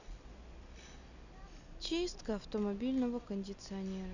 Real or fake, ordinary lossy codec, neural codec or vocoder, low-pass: real; none; none; 7.2 kHz